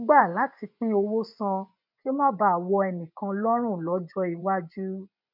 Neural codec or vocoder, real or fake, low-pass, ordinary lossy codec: vocoder, 24 kHz, 100 mel bands, Vocos; fake; 5.4 kHz; none